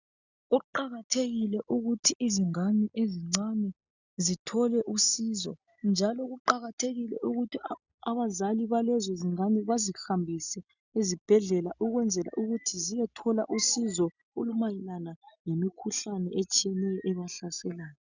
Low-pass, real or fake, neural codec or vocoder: 7.2 kHz; real; none